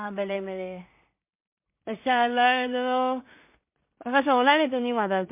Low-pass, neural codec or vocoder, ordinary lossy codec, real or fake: 3.6 kHz; codec, 16 kHz in and 24 kHz out, 0.4 kbps, LongCat-Audio-Codec, two codebook decoder; MP3, 32 kbps; fake